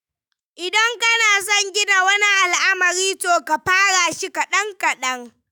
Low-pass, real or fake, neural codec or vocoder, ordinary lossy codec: none; fake; autoencoder, 48 kHz, 128 numbers a frame, DAC-VAE, trained on Japanese speech; none